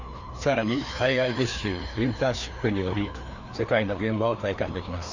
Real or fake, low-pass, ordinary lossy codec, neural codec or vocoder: fake; 7.2 kHz; Opus, 64 kbps; codec, 16 kHz, 2 kbps, FreqCodec, larger model